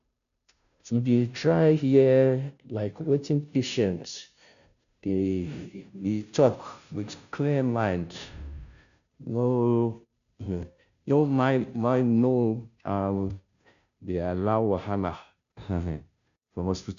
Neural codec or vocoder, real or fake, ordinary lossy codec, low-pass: codec, 16 kHz, 0.5 kbps, FunCodec, trained on Chinese and English, 25 frames a second; fake; none; 7.2 kHz